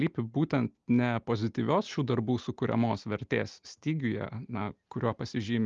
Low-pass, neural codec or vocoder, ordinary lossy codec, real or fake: 7.2 kHz; none; Opus, 32 kbps; real